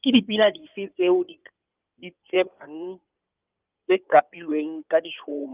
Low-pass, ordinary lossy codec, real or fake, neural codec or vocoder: 3.6 kHz; Opus, 24 kbps; fake; codec, 16 kHz in and 24 kHz out, 2.2 kbps, FireRedTTS-2 codec